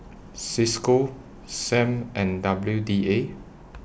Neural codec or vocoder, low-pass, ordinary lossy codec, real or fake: none; none; none; real